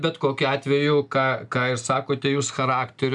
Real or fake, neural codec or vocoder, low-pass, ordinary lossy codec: real; none; 10.8 kHz; MP3, 96 kbps